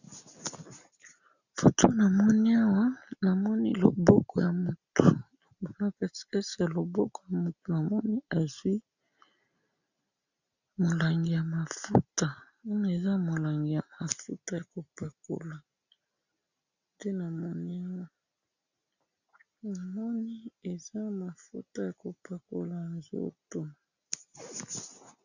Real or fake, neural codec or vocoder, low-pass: real; none; 7.2 kHz